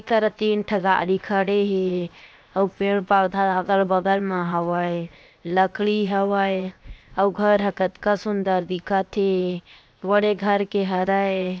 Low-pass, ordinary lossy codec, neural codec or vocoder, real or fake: none; none; codec, 16 kHz, 0.7 kbps, FocalCodec; fake